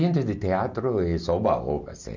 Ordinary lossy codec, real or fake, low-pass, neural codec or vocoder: none; real; 7.2 kHz; none